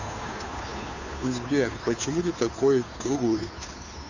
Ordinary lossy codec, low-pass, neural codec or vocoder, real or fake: none; 7.2 kHz; codec, 16 kHz, 2 kbps, FunCodec, trained on Chinese and English, 25 frames a second; fake